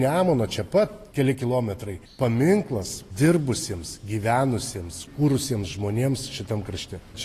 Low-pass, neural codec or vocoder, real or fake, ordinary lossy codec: 14.4 kHz; none; real; AAC, 48 kbps